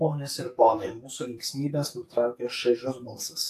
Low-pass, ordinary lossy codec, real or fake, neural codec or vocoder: 14.4 kHz; AAC, 64 kbps; fake; codec, 44.1 kHz, 2.6 kbps, SNAC